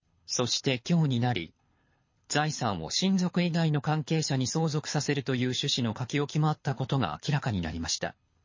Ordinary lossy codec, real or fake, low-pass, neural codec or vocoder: MP3, 32 kbps; fake; 7.2 kHz; codec, 24 kHz, 6 kbps, HILCodec